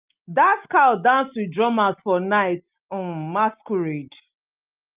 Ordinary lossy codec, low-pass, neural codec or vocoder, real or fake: Opus, 24 kbps; 3.6 kHz; none; real